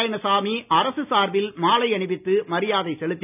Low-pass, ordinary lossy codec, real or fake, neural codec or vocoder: 3.6 kHz; none; real; none